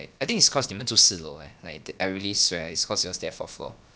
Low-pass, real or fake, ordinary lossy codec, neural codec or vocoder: none; fake; none; codec, 16 kHz, about 1 kbps, DyCAST, with the encoder's durations